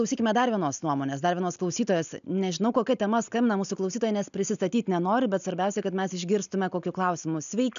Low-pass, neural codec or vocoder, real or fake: 7.2 kHz; none; real